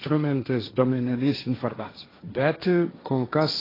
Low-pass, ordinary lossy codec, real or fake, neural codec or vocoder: 5.4 kHz; AAC, 24 kbps; fake; codec, 16 kHz, 1.1 kbps, Voila-Tokenizer